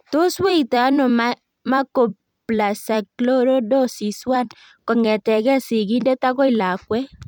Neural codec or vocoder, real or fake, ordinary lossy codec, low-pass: vocoder, 44.1 kHz, 128 mel bands every 256 samples, BigVGAN v2; fake; none; 19.8 kHz